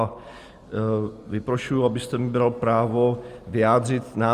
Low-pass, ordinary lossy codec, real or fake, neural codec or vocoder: 14.4 kHz; Opus, 32 kbps; real; none